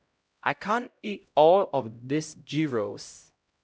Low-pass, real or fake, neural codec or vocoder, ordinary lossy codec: none; fake; codec, 16 kHz, 0.5 kbps, X-Codec, HuBERT features, trained on LibriSpeech; none